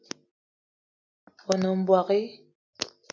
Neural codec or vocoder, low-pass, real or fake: none; 7.2 kHz; real